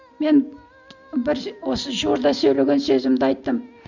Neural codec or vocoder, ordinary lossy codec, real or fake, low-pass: none; none; real; 7.2 kHz